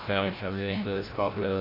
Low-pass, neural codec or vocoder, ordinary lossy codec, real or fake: 5.4 kHz; codec, 16 kHz, 0.5 kbps, FreqCodec, larger model; AAC, 24 kbps; fake